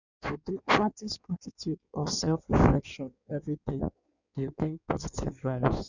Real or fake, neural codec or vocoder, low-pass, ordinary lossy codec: fake; codec, 16 kHz in and 24 kHz out, 1.1 kbps, FireRedTTS-2 codec; 7.2 kHz; none